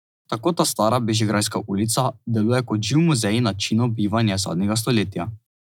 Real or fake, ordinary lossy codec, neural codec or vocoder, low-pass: real; none; none; 19.8 kHz